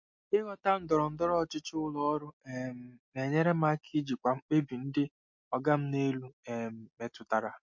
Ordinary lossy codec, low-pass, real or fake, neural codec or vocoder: MP3, 48 kbps; 7.2 kHz; real; none